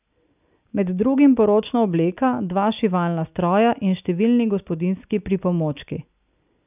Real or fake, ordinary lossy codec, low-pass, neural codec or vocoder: real; none; 3.6 kHz; none